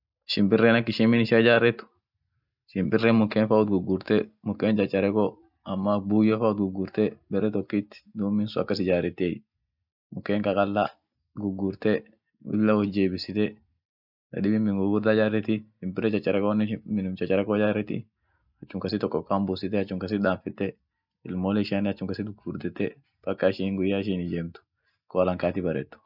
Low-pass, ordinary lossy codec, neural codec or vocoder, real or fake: 5.4 kHz; none; none; real